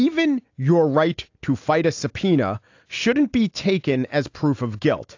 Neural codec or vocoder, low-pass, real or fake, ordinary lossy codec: none; 7.2 kHz; real; AAC, 48 kbps